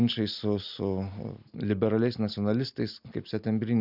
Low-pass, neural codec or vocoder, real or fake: 5.4 kHz; none; real